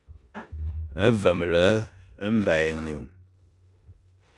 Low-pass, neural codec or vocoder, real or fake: 10.8 kHz; codec, 16 kHz in and 24 kHz out, 0.9 kbps, LongCat-Audio-Codec, four codebook decoder; fake